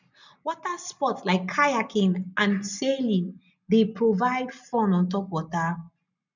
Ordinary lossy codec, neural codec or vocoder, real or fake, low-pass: none; none; real; 7.2 kHz